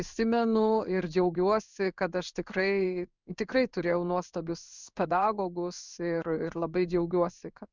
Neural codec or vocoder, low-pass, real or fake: codec, 16 kHz in and 24 kHz out, 1 kbps, XY-Tokenizer; 7.2 kHz; fake